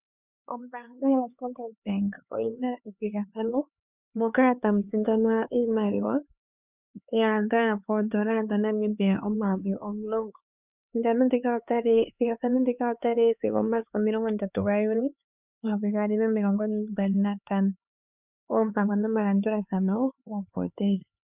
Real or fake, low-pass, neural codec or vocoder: fake; 3.6 kHz; codec, 16 kHz, 4 kbps, X-Codec, HuBERT features, trained on LibriSpeech